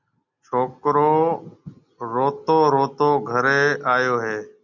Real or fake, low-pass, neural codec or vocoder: real; 7.2 kHz; none